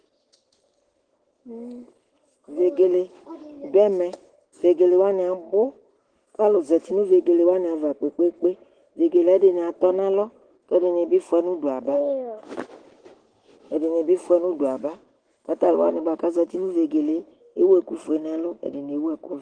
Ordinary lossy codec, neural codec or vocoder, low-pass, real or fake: Opus, 16 kbps; autoencoder, 48 kHz, 128 numbers a frame, DAC-VAE, trained on Japanese speech; 9.9 kHz; fake